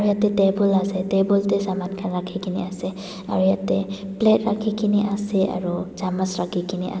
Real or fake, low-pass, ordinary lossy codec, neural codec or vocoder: real; none; none; none